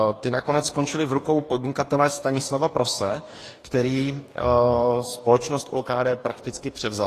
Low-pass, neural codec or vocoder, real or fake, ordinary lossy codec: 14.4 kHz; codec, 44.1 kHz, 2.6 kbps, DAC; fake; AAC, 48 kbps